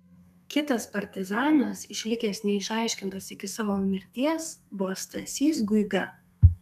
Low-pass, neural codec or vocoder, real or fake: 14.4 kHz; codec, 32 kHz, 1.9 kbps, SNAC; fake